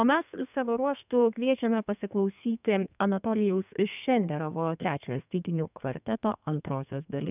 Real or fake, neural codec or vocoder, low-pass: fake; codec, 32 kHz, 1.9 kbps, SNAC; 3.6 kHz